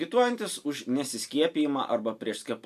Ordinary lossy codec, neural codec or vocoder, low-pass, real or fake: AAC, 96 kbps; none; 14.4 kHz; real